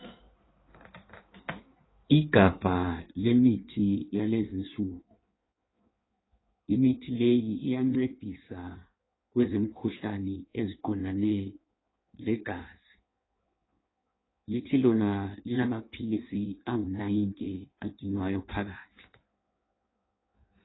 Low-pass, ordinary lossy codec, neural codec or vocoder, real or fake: 7.2 kHz; AAC, 16 kbps; codec, 16 kHz in and 24 kHz out, 1.1 kbps, FireRedTTS-2 codec; fake